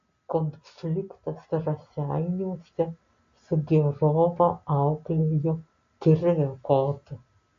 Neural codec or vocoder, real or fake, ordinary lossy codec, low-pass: none; real; MP3, 48 kbps; 7.2 kHz